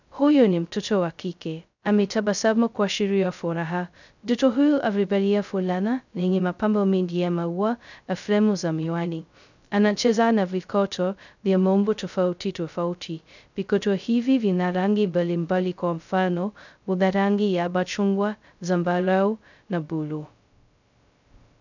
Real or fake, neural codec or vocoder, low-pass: fake; codec, 16 kHz, 0.2 kbps, FocalCodec; 7.2 kHz